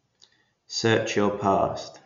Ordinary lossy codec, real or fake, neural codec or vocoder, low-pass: MP3, 64 kbps; real; none; 7.2 kHz